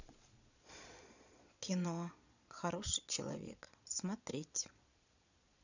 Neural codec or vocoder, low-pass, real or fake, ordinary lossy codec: vocoder, 22.05 kHz, 80 mel bands, Vocos; 7.2 kHz; fake; none